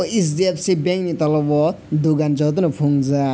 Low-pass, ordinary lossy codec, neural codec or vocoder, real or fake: none; none; none; real